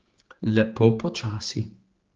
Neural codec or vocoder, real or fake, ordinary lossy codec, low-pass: codec, 16 kHz, 4 kbps, X-Codec, HuBERT features, trained on general audio; fake; Opus, 16 kbps; 7.2 kHz